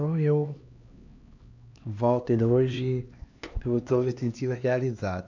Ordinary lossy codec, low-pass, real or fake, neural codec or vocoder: AAC, 48 kbps; 7.2 kHz; fake; codec, 16 kHz, 2 kbps, X-Codec, HuBERT features, trained on LibriSpeech